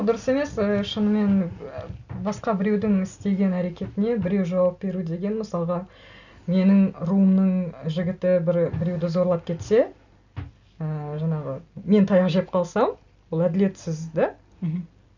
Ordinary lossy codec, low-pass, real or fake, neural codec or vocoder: none; 7.2 kHz; real; none